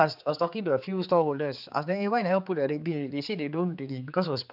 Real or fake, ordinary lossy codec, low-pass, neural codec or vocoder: fake; none; 5.4 kHz; codec, 16 kHz, 4 kbps, X-Codec, HuBERT features, trained on general audio